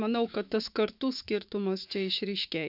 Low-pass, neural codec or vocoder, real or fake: 5.4 kHz; autoencoder, 48 kHz, 128 numbers a frame, DAC-VAE, trained on Japanese speech; fake